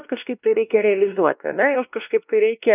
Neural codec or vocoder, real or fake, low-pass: codec, 16 kHz, 1 kbps, X-Codec, WavLM features, trained on Multilingual LibriSpeech; fake; 3.6 kHz